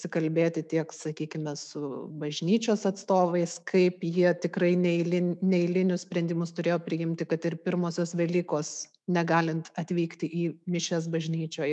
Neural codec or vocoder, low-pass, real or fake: autoencoder, 48 kHz, 128 numbers a frame, DAC-VAE, trained on Japanese speech; 10.8 kHz; fake